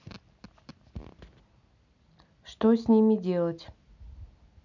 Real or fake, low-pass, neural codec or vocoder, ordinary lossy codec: real; 7.2 kHz; none; none